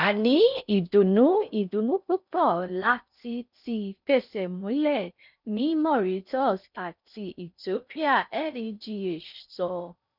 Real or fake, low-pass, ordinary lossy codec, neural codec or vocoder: fake; 5.4 kHz; none; codec, 16 kHz in and 24 kHz out, 0.6 kbps, FocalCodec, streaming, 4096 codes